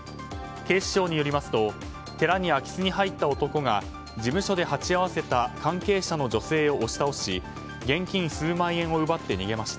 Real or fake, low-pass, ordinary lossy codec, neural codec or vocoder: real; none; none; none